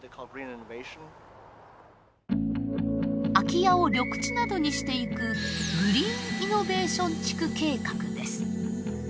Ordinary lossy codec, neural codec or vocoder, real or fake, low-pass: none; none; real; none